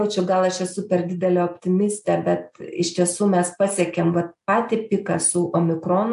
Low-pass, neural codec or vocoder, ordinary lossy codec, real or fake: 10.8 kHz; none; AAC, 96 kbps; real